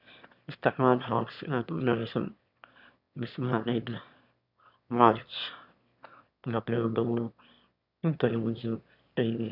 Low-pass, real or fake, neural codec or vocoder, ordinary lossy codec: 5.4 kHz; fake; autoencoder, 22.05 kHz, a latent of 192 numbers a frame, VITS, trained on one speaker; none